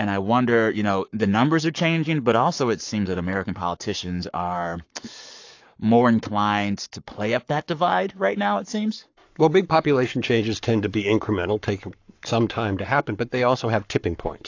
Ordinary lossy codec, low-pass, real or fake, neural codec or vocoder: AAC, 48 kbps; 7.2 kHz; fake; codec, 44.1 kHz, 7.8 kbps, Pupu-Codec